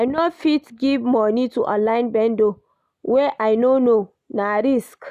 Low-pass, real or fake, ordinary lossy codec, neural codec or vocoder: 14.4 kHz; real; none; none